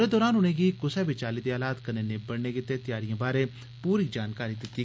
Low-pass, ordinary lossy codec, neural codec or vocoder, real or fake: none; none; none; real